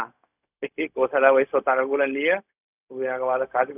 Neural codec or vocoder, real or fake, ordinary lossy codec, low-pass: codec, 16 kHz, 0.4 kbps, LongCat-Audio-Codec; fake; none; 3.6 kHz